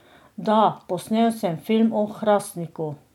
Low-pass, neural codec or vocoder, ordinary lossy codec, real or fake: 19.8 kHz; none; none; real